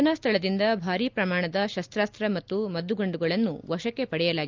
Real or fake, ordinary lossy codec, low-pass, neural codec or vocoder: real; Opus, 24 kbps; 7.2 kHz; none